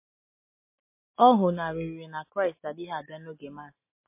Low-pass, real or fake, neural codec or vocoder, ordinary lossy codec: 3.6 kHz; real; none; MP3, 24 kbps